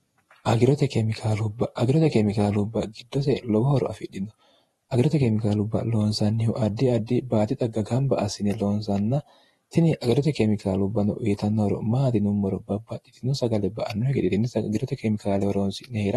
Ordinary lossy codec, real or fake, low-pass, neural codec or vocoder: AAC, 32 kbps; fake; 19.8 kHz; vocoder, 48 kHz, 128 mel bands, Vocos